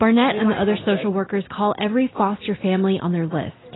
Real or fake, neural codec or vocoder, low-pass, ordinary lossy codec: real; none; 7.2 kHz; AAC, 16 kbps